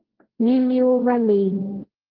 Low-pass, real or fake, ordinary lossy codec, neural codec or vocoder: 5.4 kHz; fake; Opus, 16 kbps; codec, 16 kHz, 1.1 kbps, Voila-Tokenizer